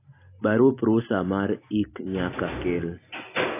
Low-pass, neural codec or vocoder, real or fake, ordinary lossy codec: 3.6 kHz; none; real; AAC, 24 kbps